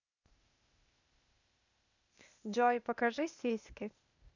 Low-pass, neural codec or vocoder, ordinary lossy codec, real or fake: 7.2 kHz; codec, 16 kHz, 0.8 kbps, ZipCodec; none; fake